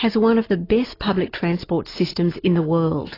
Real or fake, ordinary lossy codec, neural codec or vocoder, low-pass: real; AAC, 24 kbps; none; 5.4 kHz